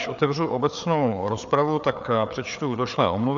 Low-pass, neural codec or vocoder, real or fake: 7.2 kHz; codec, 16 kHz, 4 kbps, FreqCodec, larger model; fake